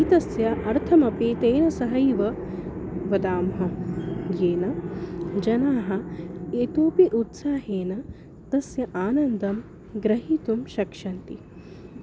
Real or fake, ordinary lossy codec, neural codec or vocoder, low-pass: real; none; none; none